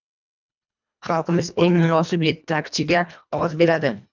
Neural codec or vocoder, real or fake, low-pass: codec, 24 kHz, 1.5 kbps, HILCodec; fake; 7.2 kHz